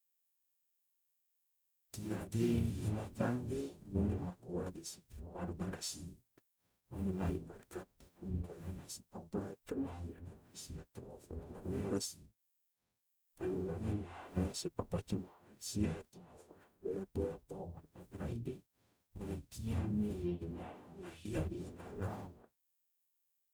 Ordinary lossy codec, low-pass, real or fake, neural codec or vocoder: none; none; fake; codec, 44.1 kHz, 0.9 kbps, DAC